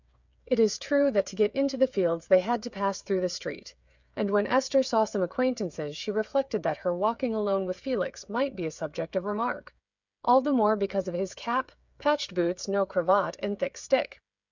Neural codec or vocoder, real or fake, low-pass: codec, 16 kHz, 8 kbps, FreqCodec, smaller model; fake; 7.2 kHz